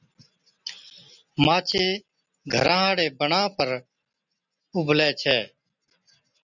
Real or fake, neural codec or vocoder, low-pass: real; none; 7.2 kHz